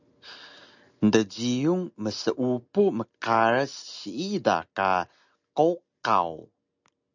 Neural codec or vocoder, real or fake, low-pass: none; real; 7.2 kHz